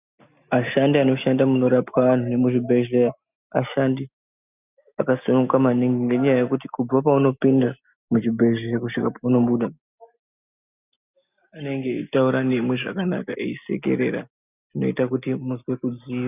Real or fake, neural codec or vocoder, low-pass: real; none; 3.6 kHz